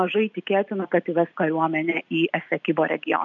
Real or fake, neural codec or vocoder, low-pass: real; none; 7.2 kHz